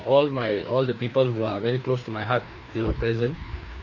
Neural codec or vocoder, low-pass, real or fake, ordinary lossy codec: codec, 16 kHz, 2 kbps, FreqCodec, larger model; 7.2 kHz; fake; MP3, 64 kbps